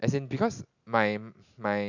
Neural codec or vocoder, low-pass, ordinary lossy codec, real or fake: none; 7.2 kHz; none; real